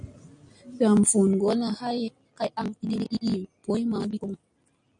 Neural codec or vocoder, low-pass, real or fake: none; 9.9 kHz; real